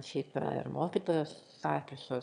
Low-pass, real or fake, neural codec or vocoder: 9.9 kHz; fake; autoencoder, 22.05 kHz, a latent of 192 numbers a frame, VITS, trained on one speaker